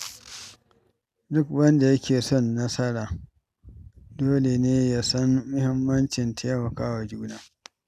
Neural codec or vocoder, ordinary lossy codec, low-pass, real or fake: none; none; 14.4 kHz; real